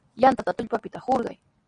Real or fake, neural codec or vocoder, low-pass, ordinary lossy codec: real; none; 9.9 kHz; Opus, 64 kbps